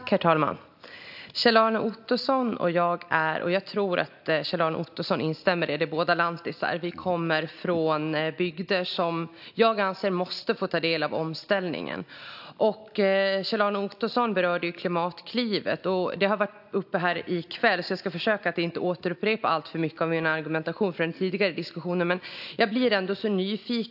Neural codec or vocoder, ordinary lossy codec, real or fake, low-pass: none; AAC, 48 kbps; real; 5.4 kHz